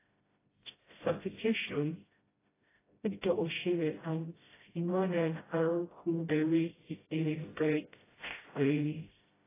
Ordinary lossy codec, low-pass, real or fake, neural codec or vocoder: AAC, 16 kbps; 3.6 kHz; fake; codec, 16 kHz, 0.5 kbps, FreqCodec, smaller model